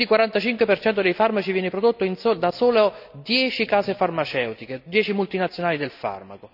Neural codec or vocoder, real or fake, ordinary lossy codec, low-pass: none; real; none; 5.4 kHz